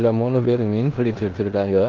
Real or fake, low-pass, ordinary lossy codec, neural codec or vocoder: fake; 7.2 kHz; Opus, 16 kbps; codec, 16 kHz in and 24 kHz out, 0.9 kbps, LongCat-Audio-Codec, four codebook decoder